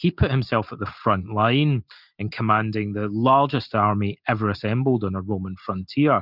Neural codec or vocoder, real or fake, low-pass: none; real; 5.4 kHz